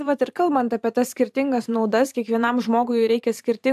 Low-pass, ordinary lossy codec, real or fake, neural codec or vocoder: 14.4 kHz; AAC, 96 kbps; fake; vocoder, 44.1 kHz, 128 mel bands every 512 samples, BigVGAN v2